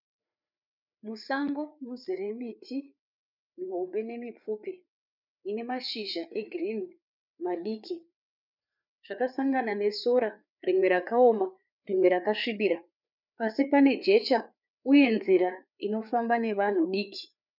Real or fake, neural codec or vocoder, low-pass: fake; codec, 16 kHz, 4 kbps, FreqCodec, larger model; 5.4 kHz